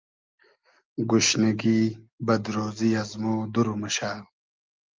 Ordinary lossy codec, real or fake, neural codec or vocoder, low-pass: Opus, 32 kbps; real; none; 7.2 kHz